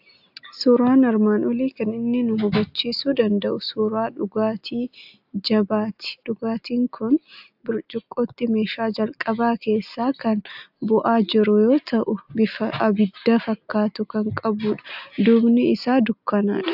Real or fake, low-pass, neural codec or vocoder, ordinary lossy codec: real; 5.4 kHz; none; AAC, 48 kbps